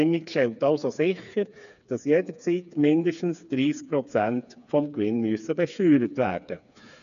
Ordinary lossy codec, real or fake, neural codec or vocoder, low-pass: none; fake; codec, 16 kHz, 4 kbps, FreqCodec, smaller model; 7.2 kHz